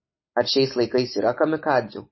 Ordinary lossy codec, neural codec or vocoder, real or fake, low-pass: MP3, 24 kbps; none; real; 7.2 kHz